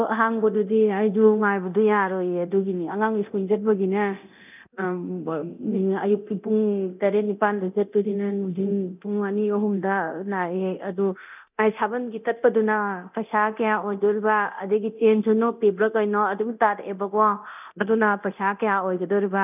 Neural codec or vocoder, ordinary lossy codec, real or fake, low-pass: codec, 24 kHz, 0.9 kbps, DualCodec; none; fake; 3.6 kHz